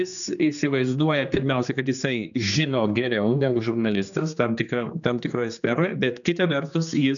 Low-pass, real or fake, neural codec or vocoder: 7.2 kHz; fake; codec, 16 kHz, 2 kbps, X-Codec, HuBERT features, trained on general audio